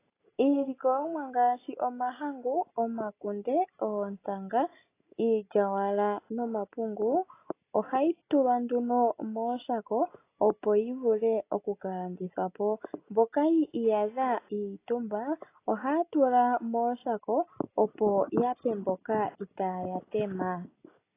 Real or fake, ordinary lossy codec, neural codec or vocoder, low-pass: real; AAC, 24 kbps; none; 3.6 kHz